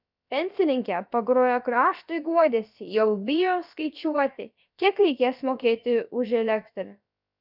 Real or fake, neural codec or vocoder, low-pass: fake; codec, 16 kHz, about 1 kbps, DyCAST, with the encoder's durations; 5.4 kHz